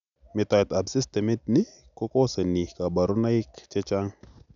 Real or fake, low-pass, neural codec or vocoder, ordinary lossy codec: real; 7.2 kHz; none; none